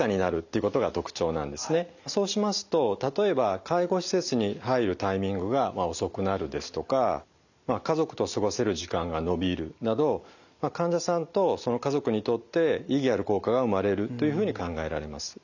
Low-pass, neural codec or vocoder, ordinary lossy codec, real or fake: 7.2 kHz; none; none; real